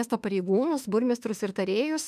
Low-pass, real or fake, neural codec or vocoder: 14.4 kHz; fake; autoencoder, 48 kHz, 32 numbers a frame, DAC-VAE, trained on Japanese speech